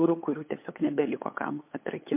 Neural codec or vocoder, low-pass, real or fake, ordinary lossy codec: codec, 16 kHz, 8 kbps, FunCodec, trained on LibriTTS, 25 frames a second; 3.6 kHz; fake; MP3, 24 kbps